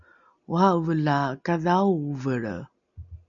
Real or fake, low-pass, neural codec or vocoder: real; 7.2 kHz; none